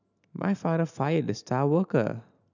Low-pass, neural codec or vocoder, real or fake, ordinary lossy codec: 7.2 kHz; none; real; none